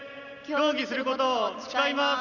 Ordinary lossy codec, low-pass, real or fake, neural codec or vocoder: none; 7.2 kHz; real; none